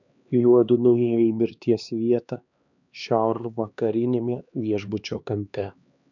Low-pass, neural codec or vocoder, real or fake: 7.2 kHz; codec, 16 kHz, 2 kbps, X-Codec, HuBERT features, trained on LibriSpeech; fake